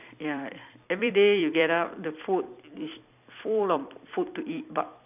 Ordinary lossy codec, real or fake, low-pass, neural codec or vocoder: none; fake; 3.6 kHz; vocoder, 44.1 kHz, 128 mel bands every 256 samples, BigVGAN v2